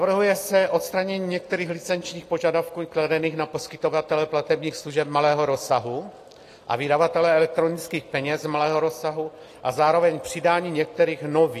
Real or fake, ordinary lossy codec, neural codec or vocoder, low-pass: real; AAC, 48 kbps; none; 14.4 kHz